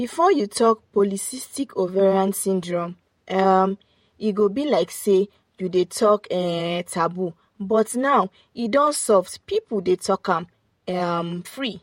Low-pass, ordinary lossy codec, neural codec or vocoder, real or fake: 19.8 kHz; MP3, 64 kbps; vocoder, 48 kHz, 128 mel bands, Vocos; fake